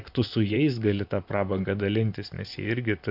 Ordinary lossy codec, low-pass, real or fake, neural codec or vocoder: AAC, 48 kbps; 5.4 kHz; fake; vocoder, 44.1 kHz, 128 mel bands, Pupu-Vocoder